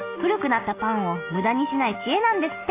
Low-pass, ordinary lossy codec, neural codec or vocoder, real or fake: 3.6 kHz; AAC, 24 kbps; none; real